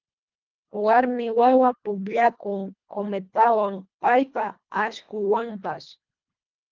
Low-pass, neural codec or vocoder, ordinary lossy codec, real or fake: 7.2 kHz; codec, 24 kHz, 1.5 kbps, HILCodec; Opus, 32 kbps; fake